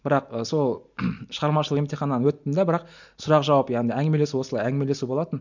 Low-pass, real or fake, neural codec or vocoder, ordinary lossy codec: 7.2 kHz; real; none; none